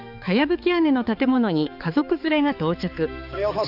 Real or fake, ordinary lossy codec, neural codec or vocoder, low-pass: fake; none; codec, 16 kHz, 4 kbps, X-Codec, HuBERT features, trained on balanced general audio; 5.4 kHz